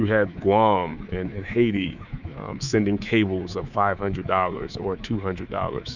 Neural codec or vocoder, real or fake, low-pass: codec, 24 kHz, 3.1 kbps, DualCodec; fake; 7.2 kHz